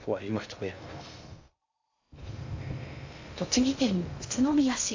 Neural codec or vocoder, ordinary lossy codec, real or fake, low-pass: codec, 16 kHz in and 24 kHz out, 0.6 kbps, FocalCodec, streaming, 2048 codes; AAC, 48 kbps; fake; 7.2 kHz